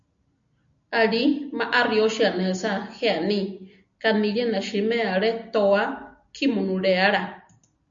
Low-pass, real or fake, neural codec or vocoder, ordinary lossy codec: 7.2 kHz; real; none; MP3, 64 kbps